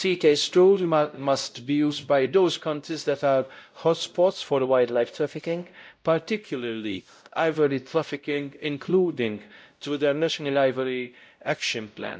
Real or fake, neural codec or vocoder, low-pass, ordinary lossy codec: fake; codec, 16 kHz, 0.5 kbps, X-Codec, WavLM features, trained on Multilingual LibriSpeech; none; none